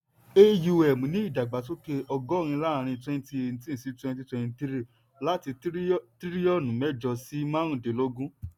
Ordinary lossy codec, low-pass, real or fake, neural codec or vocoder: none; 19.8 kHz; real; none